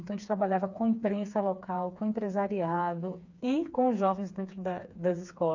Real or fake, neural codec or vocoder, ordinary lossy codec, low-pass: fake; codec, 16 kHz, 4 kbps, FreqCodec, smaller model; none; 7.2 kHz